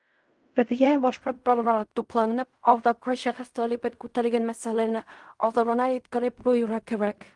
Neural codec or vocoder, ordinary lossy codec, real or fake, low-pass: codec, 16 kHz in and 24 kHz out, 0.4 kbps, LongCat-Audio-Codec, fine tuned four codebook decoder; Opus, 24 kbps; fake; 10.8 kHz